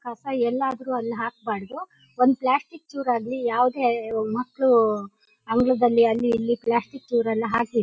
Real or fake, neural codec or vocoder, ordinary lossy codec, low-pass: real; none; none; none